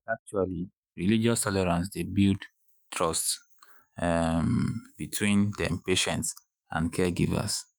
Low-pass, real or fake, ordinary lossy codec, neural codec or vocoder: none; fake; none; autoencoder, 48 kHz, 128 numbers a frame, DAC-VAE, trained on Japanese speech